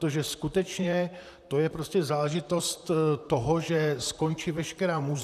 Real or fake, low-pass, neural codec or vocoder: fake; 14.4 kHz; vocoder, 44.1 kHz, 128 mel bands, Pupu-Vocoder